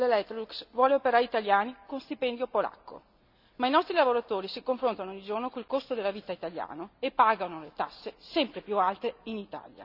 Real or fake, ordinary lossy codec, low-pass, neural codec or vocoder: real; none; 5.4 kHz; none